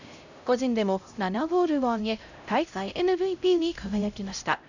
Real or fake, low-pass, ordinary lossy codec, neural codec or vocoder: fake; 7.2 kHz; none; codec, 16 kHz, 0.5 kbps, X-Codec, HuBERT features, trained on LibriSpeech